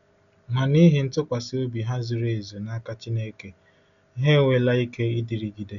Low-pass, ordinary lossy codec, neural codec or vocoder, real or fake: 7.2 kHz; none; none; real